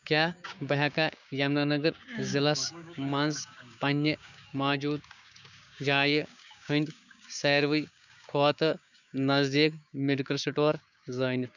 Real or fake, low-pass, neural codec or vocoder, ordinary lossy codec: fake; 7.2 kHz; codec, 16 kHz, 6 kbps, DAC; none